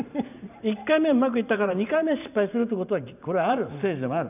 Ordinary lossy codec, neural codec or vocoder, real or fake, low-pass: none; vocoder, 44.1 kHz, 128 mel bands every 512 samples, BigVGAN v2; fake; 3.6 kHz